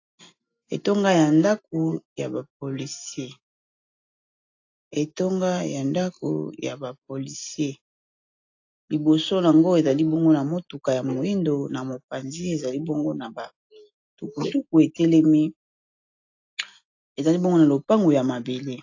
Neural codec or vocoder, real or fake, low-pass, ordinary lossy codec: none; real; 7.2 kHz; AAC, 48 kbps